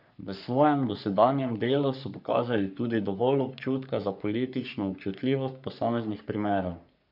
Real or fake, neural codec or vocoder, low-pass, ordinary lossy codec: fake; codec, 44.1 kHz, 3.4 kbps, Pupu-Codec; 5.4 kHz; none